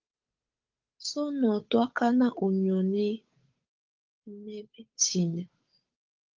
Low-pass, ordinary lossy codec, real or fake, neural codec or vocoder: 7.2 kHz; Opus, 24 kbps; fake; codec, 16 kHz, 8 kbps, FunCodec, trained on Chinese and English, 25 frames a second